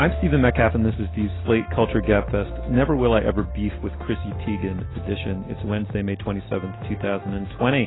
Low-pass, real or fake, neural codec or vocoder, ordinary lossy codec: 7.2 kHz; real; none; AAC, 16 kbps